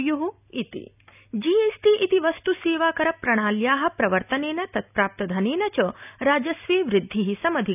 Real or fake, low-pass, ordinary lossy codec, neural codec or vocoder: real; 3.6 kHz; none; none